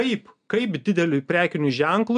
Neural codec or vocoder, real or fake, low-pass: none; real; 9.9 kHz